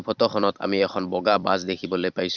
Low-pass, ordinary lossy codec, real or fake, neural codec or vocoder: 7.2 kHz; Opus, 64 kbps; fake; vocoder, 44.1 kHz, 128 mel bands every 256 samples, BigVGAN v2